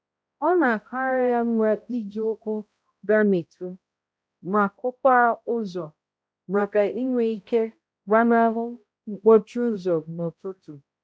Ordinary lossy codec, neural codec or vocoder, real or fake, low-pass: none; codec, 16 kHz, 0.5 kbps, X-Codec, HuBERT features, trained on balanced general audio; fake; none